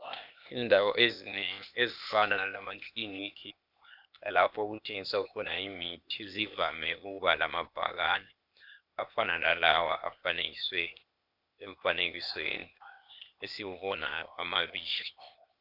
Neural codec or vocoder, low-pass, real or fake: codec, 16 kHz, 0.8 kbps, ZipCodec; 5.4 kHz; fake